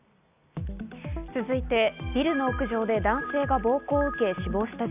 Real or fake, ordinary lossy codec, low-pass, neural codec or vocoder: real; none; 3.6 kHz; none